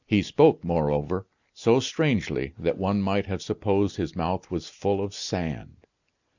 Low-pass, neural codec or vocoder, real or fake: 7.2 kHz; none; real